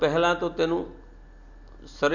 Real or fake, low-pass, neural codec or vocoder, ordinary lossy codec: real; 7.2 kHz; none; none